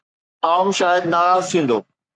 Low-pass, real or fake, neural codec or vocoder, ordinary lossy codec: 9.9 kHz; fake; codec, 44.1 kHz, 3.4 kbps, Pupu-Codec; Opus, 64 kbps